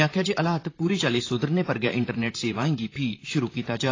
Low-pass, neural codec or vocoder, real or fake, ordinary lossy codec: 7.2 kHz; vocoder, 44.1 kHz, 128 mel bands, Pupu-Vocoder; fake; AAC, 32 kbps